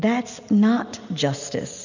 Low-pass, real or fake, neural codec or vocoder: 7.2 kHz; real; none